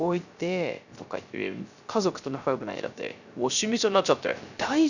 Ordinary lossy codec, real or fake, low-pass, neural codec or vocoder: none; fake; 7.2 kHz; codec, 16 kHz, 0.3 kbps, FocalCodec